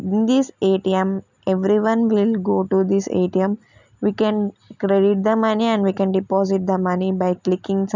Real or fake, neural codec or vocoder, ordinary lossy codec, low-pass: real; none; none; 7.2 kHz